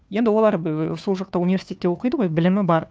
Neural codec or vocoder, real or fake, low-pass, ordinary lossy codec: codec, 16 kHz, 2 kbps, FunCodec, trained on Chinese and English, 25 frames a second; fake; none; none